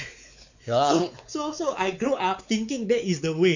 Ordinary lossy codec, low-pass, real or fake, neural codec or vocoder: none; 7.2 kHz; fake; codec, 16 kHz, 4 kbps, X-Codec, WavLM features, trained on Multilingual LibriSpeech